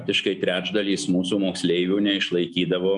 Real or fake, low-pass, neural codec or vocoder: real; 10.8 kHz; none